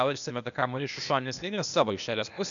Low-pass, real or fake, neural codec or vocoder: 7.2 kHz; fake; codec, 16 kHz, 0.8 kbps, ZipCodec